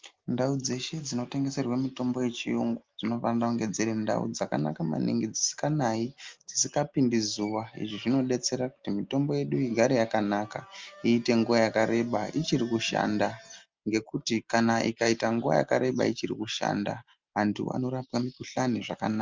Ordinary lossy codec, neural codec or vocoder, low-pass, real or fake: Opus, 32 kbps; none; 7.2 kHz; real